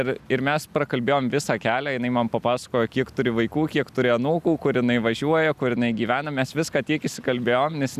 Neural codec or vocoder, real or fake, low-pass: none; real; 14.4 kHz